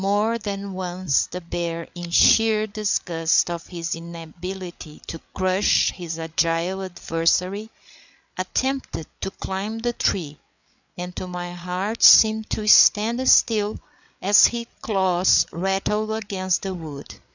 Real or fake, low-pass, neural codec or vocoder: fake; 7.2 kHz; codec, 16 kHz, 8 kbps, FreqCodec, larger model